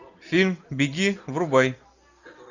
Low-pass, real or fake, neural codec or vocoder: 7.2 kHz; real; none